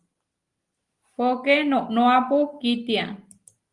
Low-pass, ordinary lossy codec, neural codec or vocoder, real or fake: 10.8 kHz; Opus, 24 kbps; none; real